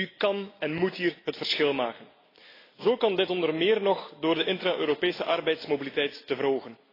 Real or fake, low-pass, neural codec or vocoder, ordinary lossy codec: real; 5.4 kHz; none; AAC, 24 kbps